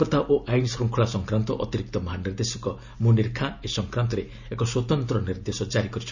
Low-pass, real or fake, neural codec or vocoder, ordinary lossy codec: 7.2 kHz; real; none; none